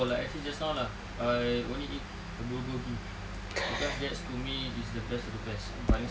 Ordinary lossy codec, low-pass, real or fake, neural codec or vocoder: none; none; real; none